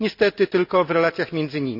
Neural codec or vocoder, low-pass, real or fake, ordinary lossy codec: none; 5.4 kHz; real; none